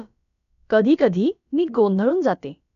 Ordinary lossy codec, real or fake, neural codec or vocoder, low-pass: none; fake; codec, 16 kHz, about 1 kbps, DyCAST, with the encoder's durations; 7.2 kHz